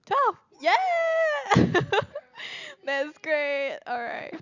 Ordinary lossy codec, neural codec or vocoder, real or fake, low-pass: none; none; real; 7.2 kHz